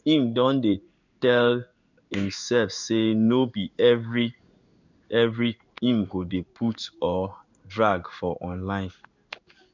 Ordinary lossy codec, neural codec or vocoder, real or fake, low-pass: none; codec, 16 kHz in and 24 kHz out, 1 kbps, XY-Tokenizer; fake; 7.2 kHz